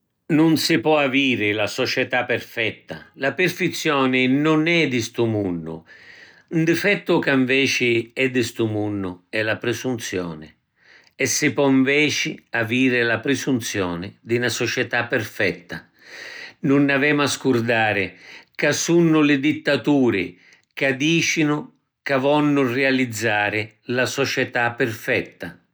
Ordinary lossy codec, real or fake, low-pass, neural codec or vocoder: none; real; none; none